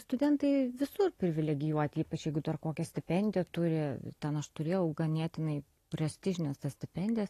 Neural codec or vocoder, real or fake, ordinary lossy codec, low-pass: none; real; AAC, 48 kbps; 14.4 kHz